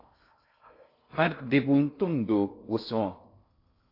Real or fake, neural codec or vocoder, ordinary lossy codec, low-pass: fake; codec, 16 kHz in and 24 kHz out, 0.6 kbps, FocalCodec, streaming, 2048 codes; AAC, 32 kbps; 5.4 kHz